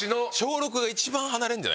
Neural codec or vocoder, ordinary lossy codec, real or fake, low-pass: none; none; real; none